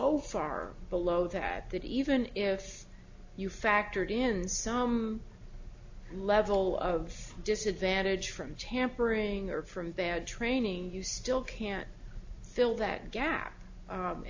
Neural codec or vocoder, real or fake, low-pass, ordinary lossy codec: none; real; 7.2 kHz; AAC, 48 kbps